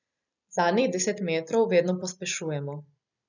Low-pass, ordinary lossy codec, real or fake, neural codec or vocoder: 7.2 kHz; none; real; none